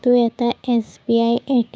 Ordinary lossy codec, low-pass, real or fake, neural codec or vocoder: none; none; fake; codec, 16 kHz, 6 kbps, DAC